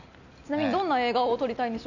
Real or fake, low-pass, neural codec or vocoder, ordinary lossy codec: real; 7.2 kHz; none; none